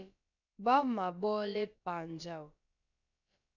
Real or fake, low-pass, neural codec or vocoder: fake; 7.2 kHz; codec, 16 kHz, about 1 kbps, DyCAST, with the encoder's durations